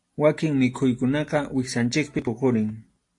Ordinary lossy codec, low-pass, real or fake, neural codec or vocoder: AAC, 48 kbps; 10.8 kHz; real; none